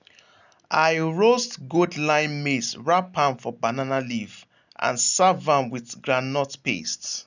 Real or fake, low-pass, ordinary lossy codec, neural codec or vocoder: real; 7.2 kHz; none; none